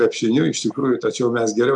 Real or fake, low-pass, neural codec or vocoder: real; 10.8 kHz; none